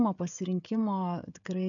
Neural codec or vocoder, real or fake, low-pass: codec, 16 kHz, 16 kbps, FreqCodec, smaller model; fake; 7.2 kHz